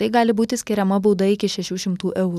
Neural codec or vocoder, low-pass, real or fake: none; 14.4 kHz; real